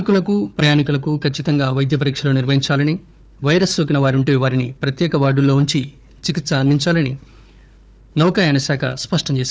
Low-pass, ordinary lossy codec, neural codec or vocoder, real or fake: none; none; codec, 16 kHz, 4 kbps, FunCodec, trained on Chinese and English, 50 frames a second; fake